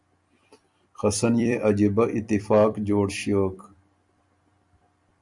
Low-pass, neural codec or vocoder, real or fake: 10.8 kHz; vocoder, 44.1 kHz, 128 mel bands every 256 samples, BigVGAN v2; fake